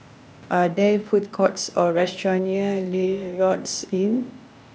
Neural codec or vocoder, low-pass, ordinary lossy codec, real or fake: codec, 16 kHz, 0.8 kbps, ZipCodec; none; none; fake